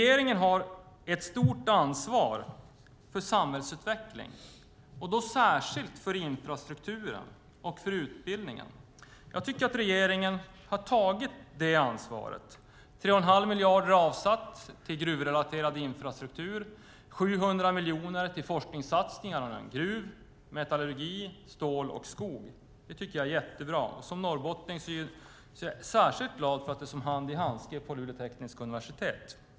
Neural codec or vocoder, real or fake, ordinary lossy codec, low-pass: none; real; none; none